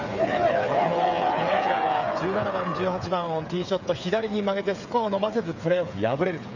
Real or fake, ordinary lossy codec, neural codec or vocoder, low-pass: fake; none; codec, 16 kHz, 8 kbps, FreqCodec, smaller model; 7.2 kHz